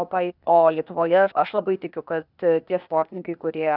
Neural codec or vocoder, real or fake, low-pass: codec, 16 kHz, 0.8 kbps, ZipCodec; fake; 5.4 kHz